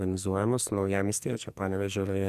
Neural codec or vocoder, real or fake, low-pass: codec, 44.1 kHz, 2.6 kbps, SNAC; fake; 14.4 kHz